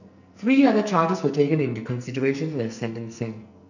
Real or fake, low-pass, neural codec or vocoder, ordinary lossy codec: fake; 7.2 kHz; codec, 44.1 kHz, 2.6 kbps, SNAC; none